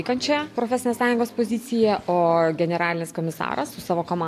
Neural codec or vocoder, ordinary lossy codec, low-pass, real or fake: none; AAC, 64 kbps; 14.4 kHz; real